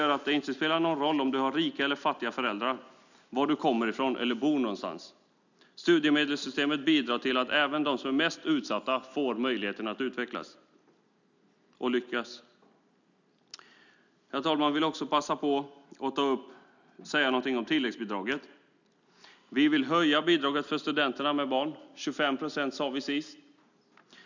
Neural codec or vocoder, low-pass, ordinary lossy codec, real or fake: none; 7.2 kHz; none; real